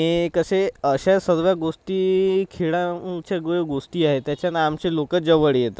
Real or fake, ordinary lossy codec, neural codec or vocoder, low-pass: real; none; none; none